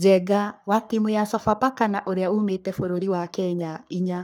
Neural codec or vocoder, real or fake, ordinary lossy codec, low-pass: codec, 44.1 kHz, 3.4 kbps, Pupu-Codec; fake; none; none